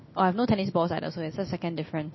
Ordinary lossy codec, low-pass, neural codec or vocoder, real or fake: MP3, 24 kbps; 7.2 kHz; none; real